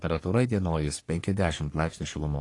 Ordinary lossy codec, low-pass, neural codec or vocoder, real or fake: AAC, 48 kbps; 10.8 kHz; codec, 44.1 kHz, 3.4 kbps, Pupu-Codec; fake